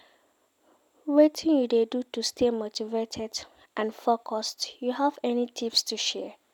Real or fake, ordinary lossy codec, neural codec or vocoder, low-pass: real; none; none; 19.8 kHz